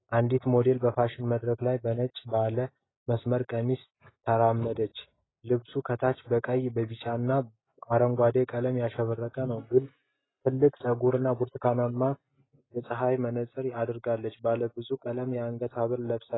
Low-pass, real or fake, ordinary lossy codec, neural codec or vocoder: 7.2 kHz; real; AAC, 16 kbps; none